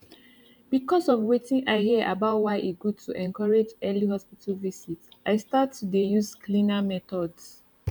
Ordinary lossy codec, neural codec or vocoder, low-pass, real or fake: none; vocoder, 44.1 kHz, 128 mel bands every 512 samples, BigVGAN v2; 19.8 kHz; fake